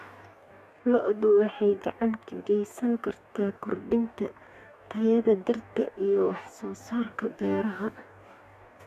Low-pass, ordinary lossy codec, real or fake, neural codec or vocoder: 14.4 kHz; none; fake; codec, 44.1 kHz, 2.6 kbps, DAC